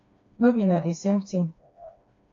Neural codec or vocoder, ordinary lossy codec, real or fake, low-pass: codec, 16 kHz, 2 kbps, FreqCodec, smaller model; AAC, 48 kbps; fake; 7.2 kHz